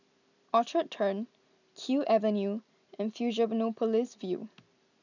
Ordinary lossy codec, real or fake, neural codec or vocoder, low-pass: none; real; none; 7.2 kHz